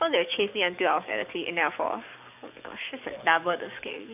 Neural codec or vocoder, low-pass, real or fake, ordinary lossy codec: codec, 44.1 kHz, 7.8 kbps, DAC; 3.6 kHz; fake; none